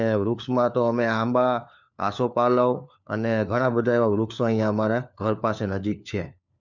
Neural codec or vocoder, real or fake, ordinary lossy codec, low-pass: codec, 16 kHz, 4 kbps, FunCodec, trained on LibriTTS, 50 frames a second; fake; none; 7.2 kHz